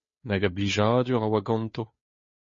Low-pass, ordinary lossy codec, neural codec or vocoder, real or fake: 7.2 kHz; MP3, 32 kbps; codec, 16 kHz, 2 kbps, FunCodec, trained on Chinese and English, 25 frames a second; fake